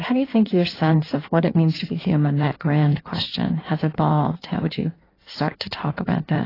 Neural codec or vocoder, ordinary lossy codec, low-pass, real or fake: codec, 16 kHz in and 24 kHz out, 1.1 kbps, FireRedTTS-2 codec; AAC, 24 kbps; 5.4 kHz; fake